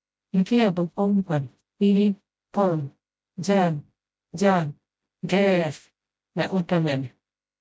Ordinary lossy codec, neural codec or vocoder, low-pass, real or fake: none; codec, 16 kHz, 0.5 kbps, FreqCodec, smaller model; none; fake